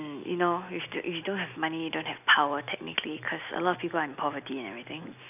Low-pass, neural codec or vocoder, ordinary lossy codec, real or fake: 3.6 kHz; none; none; real